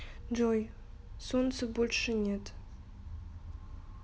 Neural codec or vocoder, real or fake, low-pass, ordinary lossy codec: none; real; none; none